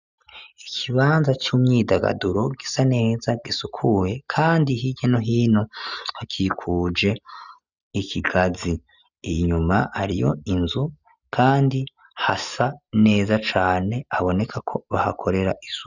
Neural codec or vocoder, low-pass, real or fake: none; 7.2 kHz; real